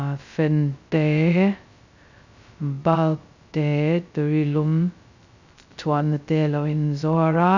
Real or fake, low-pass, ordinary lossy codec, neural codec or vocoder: fake; 7.2 kHz; none; codec, 16 kHz, 0.2 kbps, FocalCodec